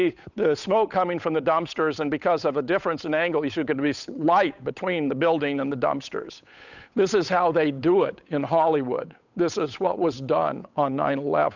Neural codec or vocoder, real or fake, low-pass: codec, 16 kHz, 8 kbps, FunCodec, trained on Chinese and English, 25 frames a second; fake; 7.2 kHz